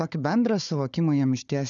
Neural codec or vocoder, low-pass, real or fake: codec, 16 kHz, 4 kbps, FunCodec, trained on Chinese and English, 50 frames a second; 7.2 kHz; fake